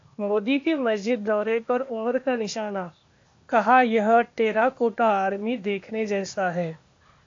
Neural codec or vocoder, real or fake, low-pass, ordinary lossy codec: codec, 16 kHz, 0.8 kbps, ZipCodec; fake; 7.2 kHz; AAC, 48 kbps